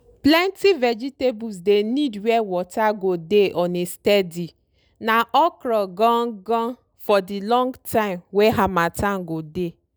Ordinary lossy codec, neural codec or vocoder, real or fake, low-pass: none; none; real; none